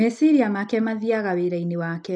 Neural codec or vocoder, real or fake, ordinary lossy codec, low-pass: none; real; none; 9.9 kHz